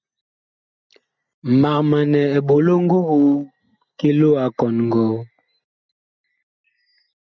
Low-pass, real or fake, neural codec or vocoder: 7.2 kHz; real; none